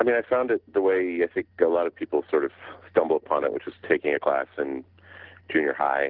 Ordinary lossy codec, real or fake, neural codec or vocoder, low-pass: Opus, 16 kbps; real; none; 5.4 kHz